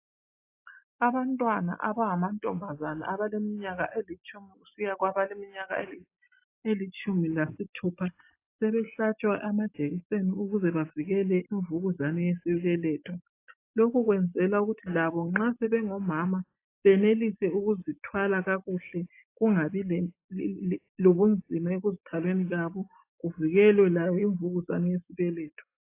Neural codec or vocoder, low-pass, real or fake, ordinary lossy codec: none; 3.6 kHz; real; AAC, 24 kbps